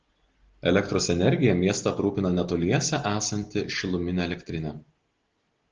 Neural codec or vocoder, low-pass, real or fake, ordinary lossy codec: none; 7.2 kHz; real; Opus, 16 kbps